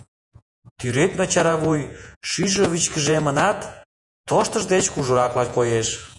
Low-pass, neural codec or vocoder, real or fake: 10.8 kHz; vocoder, 48 kHz, 128 mel bands, Vocos; fake